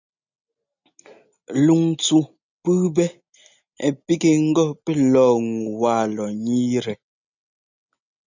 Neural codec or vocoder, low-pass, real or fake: none; 7.2 kHz; real